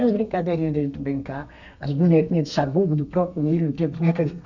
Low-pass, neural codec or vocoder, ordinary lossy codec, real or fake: 7.2 kHz; codec, 32 kHz, 1.9 kbps, SNAC; none; fake